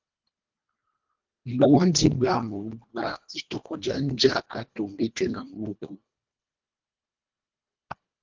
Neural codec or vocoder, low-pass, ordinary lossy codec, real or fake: codec, 24 kHz, 1.5 kbps, HILCodec; 7.2 kHz; Opus, 24 kbps; fake